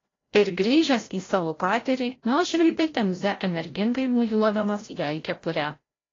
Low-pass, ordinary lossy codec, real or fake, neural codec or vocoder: 7.2 kHz; AAC, 32 kbps; fake; codec, 16 kHz, 0.5 kbps, FreqCodec, larger model